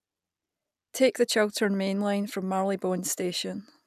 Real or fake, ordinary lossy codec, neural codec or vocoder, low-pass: real; none; none; 14.4 kHz